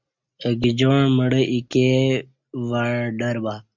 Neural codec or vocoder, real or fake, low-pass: none; real; 7.2 kHz